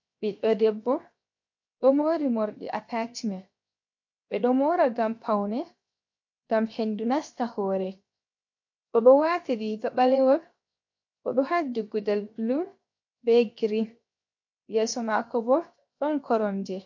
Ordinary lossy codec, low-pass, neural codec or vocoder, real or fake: MP3, 48 kbps; 7.2 kHz; codec, 16 kHz, 0.7 kbps, FocalCodec; fake